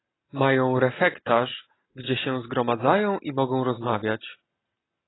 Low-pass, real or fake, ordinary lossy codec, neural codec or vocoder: 7.2 kHz; real; AAC, 16 kbps; none